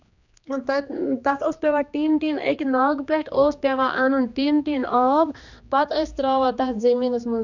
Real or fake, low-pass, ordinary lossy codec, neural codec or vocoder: fake; 7.2 kHz; none; codec, 16 kHz, 2 kbps, X-Codec, HuBERT features, trained on general audio